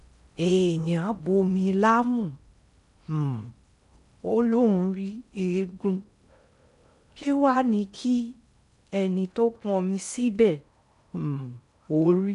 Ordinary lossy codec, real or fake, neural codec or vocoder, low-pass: none; fake; codec, 16 kHz in and 24 kHz out, 0.6 kbps, FocalCodec, streaming, 4096 codes; 10.8 kHz